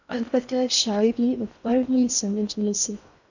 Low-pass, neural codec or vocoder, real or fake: 7.2 kHz; codec, 16 kHz in and 24 kHz out, 0.6 kbps, FocalCodec, streaming, 4096 codes; fake